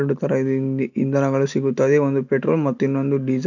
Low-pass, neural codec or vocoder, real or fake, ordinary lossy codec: 7.2 kHz; none; real; none